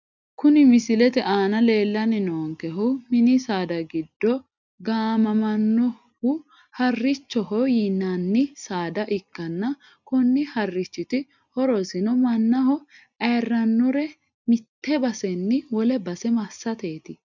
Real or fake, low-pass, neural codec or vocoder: real; 7.2 kHz; none